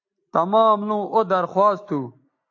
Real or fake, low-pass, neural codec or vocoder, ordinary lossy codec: real; 7.2 kHz; none; AAC, 48 kbps